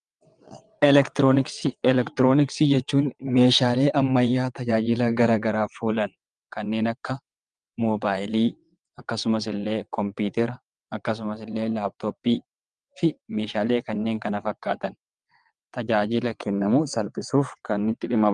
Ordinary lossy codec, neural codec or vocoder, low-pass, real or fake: Opus, 32 kbps; vocoder, 22.05 kHz, 80 mel bands, WaveNeXt; 9.9 kHz; fake